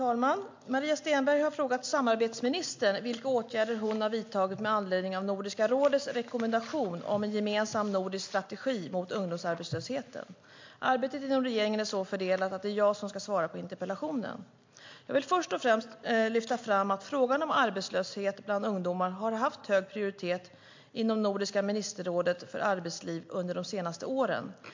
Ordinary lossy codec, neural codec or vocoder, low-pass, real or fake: MP3, 48 kbps; none; 7.2 kHz; real